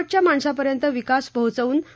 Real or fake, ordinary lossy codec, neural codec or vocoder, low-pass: real; none; none; none